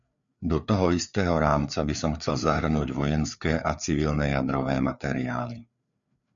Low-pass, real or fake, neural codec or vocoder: 7.2 kHz; fake; codec, 16 kHz, 4 kbps, FreqCodec, larger model